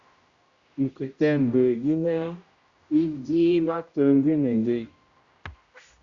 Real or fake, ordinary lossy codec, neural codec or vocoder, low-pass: fake; Opus, 64 kbps; codec, 16 kHz, 0.5 kbps, X-Codec, HuBERT features, trained on general audio; 7.2 kHz